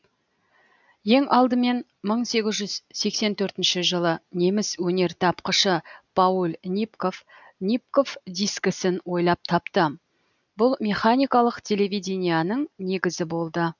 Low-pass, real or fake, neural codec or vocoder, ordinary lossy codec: 7.2 kHz; real; none; none